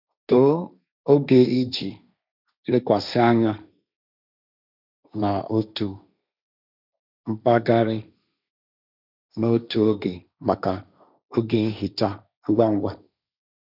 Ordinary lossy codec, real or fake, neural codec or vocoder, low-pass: none; fake; codec, 16 kHz, 1.1 kbps, Voila-Tokenizer; 5.4 kHz